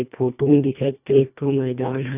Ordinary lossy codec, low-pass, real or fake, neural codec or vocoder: none; 3.6 kHz; fake; codec, 24 kHz, 1.5 kbps, HILCodec